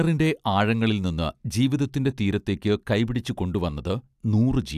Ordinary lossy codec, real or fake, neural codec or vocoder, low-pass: AAC, 96 kbps; real; none; 14.4 kHz